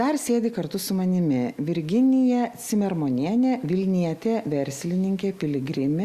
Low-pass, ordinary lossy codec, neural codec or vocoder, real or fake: 14.4 kHz; Opus, 64 kbps; none; real